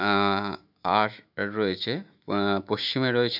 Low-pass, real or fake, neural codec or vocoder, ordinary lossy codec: 5.4 kHz; fake; autoencoder, 48 kHz, 128 numbers a frame, DAC-VAE, trained on Japanese speech; none